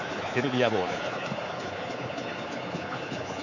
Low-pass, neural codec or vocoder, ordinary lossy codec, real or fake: 7.2 kHz; codec, 24 kHz, 3.1 kbps, DualCodec; none; fake